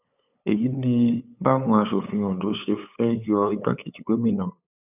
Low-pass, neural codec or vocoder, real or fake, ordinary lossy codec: 3.6 kHz; codec, 16 kHz, 8 kbps, FunCodec, trained on LibriTTS, 25 frames a second; fake; none